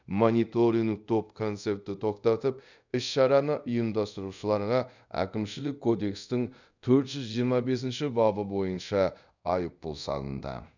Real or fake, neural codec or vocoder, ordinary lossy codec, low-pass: fake; codec, 24 kHz, 0.5 kbps, DualCodec; none; 7.2 kHz